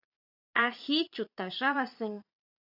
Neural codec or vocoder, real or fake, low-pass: vocoder, 22.05 kHz, 80 mel bands, Vocos; fake; 5.4 kHz